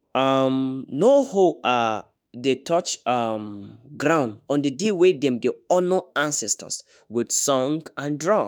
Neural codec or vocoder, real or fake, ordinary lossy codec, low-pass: autoencoder, 48 kHz, 32 numbers a frame, DAC-VAE, trained on Japanese speech; fake; none; none